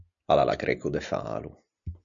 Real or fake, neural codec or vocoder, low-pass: real; none; 7.2 kHz